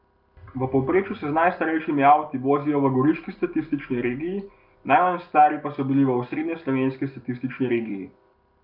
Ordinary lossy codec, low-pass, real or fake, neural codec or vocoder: Opus, 32 kbps; 5.4 kHz; real; none